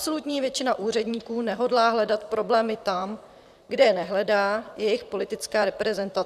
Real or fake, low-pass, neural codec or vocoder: fake; 14.4 kHz; vocoder, 44.1 kHz, 128 mel bands, Pupu-Vocoder